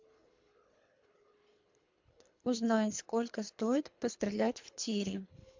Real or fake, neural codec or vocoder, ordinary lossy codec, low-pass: fake; codec, 24 kHz, 3 kbps, HILCodec; MP3, 64 kbps; 7.2 kHz